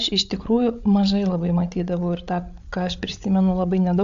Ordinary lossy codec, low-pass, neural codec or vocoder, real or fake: AAC, 64 kbps; 7.2 kHz; codec, 16 kHz, 8 kbps, FreqCodec, larger model; fake